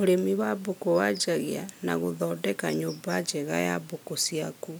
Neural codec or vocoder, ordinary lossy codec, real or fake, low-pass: none; none; real; none